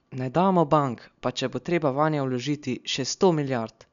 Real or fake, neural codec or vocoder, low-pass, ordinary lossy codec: real; none; 7.2 kHz; none